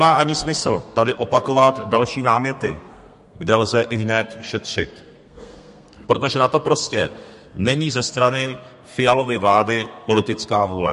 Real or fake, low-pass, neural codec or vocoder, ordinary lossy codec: fake; 14.4 kHz; codec, 32 kHz, 1.9 kbps, SNAC; MP3, 48 kbps